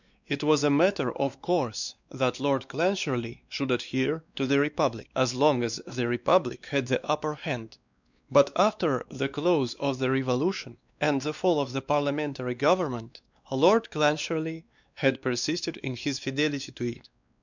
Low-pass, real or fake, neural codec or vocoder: 7.2 kHz; fake; codec, 16 kHz, 2 kbps, X-Codec, WavLM features, trained on Multilingual LibriSpeech